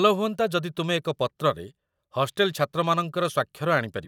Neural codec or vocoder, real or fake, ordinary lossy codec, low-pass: vocoder, 44.1 kHz, 128 mel bands every 256 samples, BigVGAN v2; fake; none; 19.8 kHz